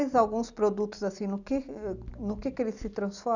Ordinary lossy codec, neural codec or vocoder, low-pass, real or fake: none; none; 7.2 kHz; real